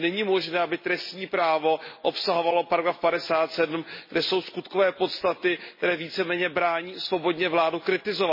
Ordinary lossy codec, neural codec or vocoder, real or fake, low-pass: MP3, 24 kbps; none; real; 5.4 kHz